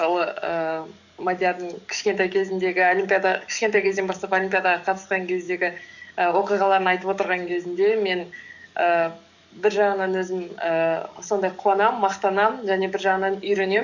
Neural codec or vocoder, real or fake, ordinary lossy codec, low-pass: codec, 44.1 kHz, 7.8 kbps, DAC; fake; none; 7.2 kHz